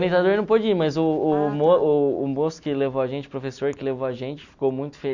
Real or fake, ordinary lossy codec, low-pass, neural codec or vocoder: real; none; 7.2 kHz; none